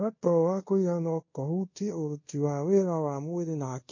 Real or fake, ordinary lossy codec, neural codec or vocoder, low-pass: fake; MP3, 32 kbps; codec, 24 kHz, 0.5 kbps, DualCodec; 7.2 kHz